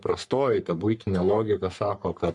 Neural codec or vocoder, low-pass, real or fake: codec, 44.1 kHz, 3.4 kbps, Pupu-Codec; 10.8 kHz; fake